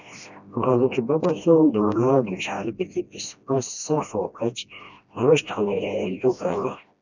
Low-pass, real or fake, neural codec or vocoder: 7.2 kHz; fake; codec, 16 kHz, 1 kbps, FreqCodec, smaller model